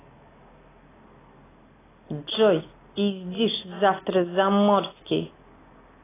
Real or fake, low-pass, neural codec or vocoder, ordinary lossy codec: real; 3.6 kHz; none; AAC, 16 kbps